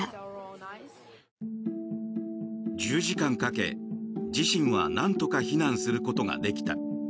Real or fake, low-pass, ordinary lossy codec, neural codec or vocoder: real; none; none; none